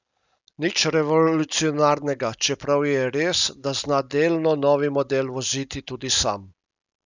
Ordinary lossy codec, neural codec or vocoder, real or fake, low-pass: none; none; real; 7.2 kHz